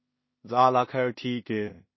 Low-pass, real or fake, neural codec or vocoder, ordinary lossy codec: 7.2 kHz; fake; codec, 16 kHz in and 24 kHz out, 0.4 kbps, LongCat-Audio-Codec, two codebook decoder; MP3, 24 kbps